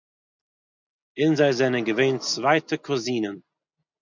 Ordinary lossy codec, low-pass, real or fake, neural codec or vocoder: MP3, 64 kbps; 7.2 kHz; real; none